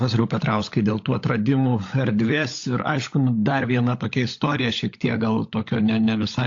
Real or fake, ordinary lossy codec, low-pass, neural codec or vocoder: fake; AAC, 48 kbps; 7.2 kHz; codec, 16 kHz, 16 kbps, FunCodec, trained on LibriTTS, 50 frames a second